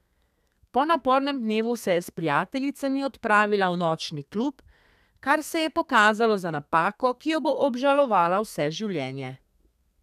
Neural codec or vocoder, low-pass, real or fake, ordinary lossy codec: codec, 32 kHz, 1.9 kbps, SNAC; 14.4 kHz; fake; none